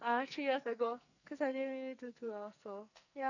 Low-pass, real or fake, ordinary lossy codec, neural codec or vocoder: 7.2 kHz; fake; none; codec, 44.1 kHz, 2.6 kbps, SNAC